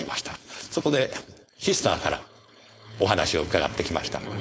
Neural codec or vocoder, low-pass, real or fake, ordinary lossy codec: codec, 16 kHz, 4.8 kbps, FACodec; none; fake; none